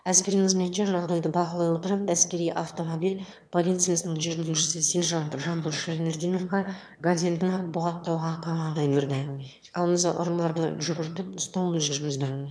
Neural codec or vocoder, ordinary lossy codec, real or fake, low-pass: autoencoder, 22.05 kHz, a latent of 192 numbers a frame, VITS, trained on one speaker; none; fake; none